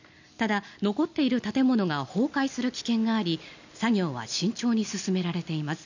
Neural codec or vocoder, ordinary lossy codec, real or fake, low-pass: none; none; real; 7.2 kHz